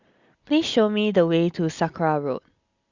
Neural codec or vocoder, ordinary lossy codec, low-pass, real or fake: none; Opus, 64 kbps; 7.2 kHz; real